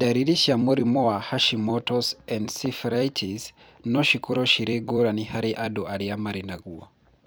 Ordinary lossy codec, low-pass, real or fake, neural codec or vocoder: none; none; fake; vocoder, 44.1 kHz, 128 mel bands every 256 samples, BigVGAN v2